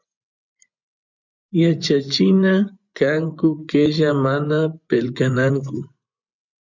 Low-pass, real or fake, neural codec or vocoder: 7.2 kHz; fake; vocoder, 24 kHz, 100 mel bands, Vocos